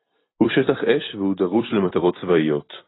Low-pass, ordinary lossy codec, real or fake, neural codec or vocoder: 7.2 kHz; AAC, 16 kbps; fake; vocoder, 44.1 kHz, 128 mel bands every 256 samples, BigVGAN v2